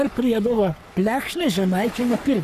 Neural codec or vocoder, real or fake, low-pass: codec, 44.1 kHz, 3.4 kbps, Pupu-Codec; fake; 14.4 kHz